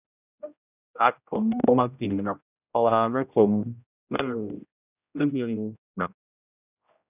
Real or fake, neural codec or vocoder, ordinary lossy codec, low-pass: fake; codec, 16 kHz, 0.5 kbps, X-Codec, HuBERT features, trained on general audio; none; 3.6 kHz